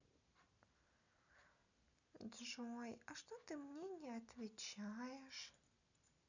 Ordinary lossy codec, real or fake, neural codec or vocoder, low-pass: none; real; none; 7.2 kHz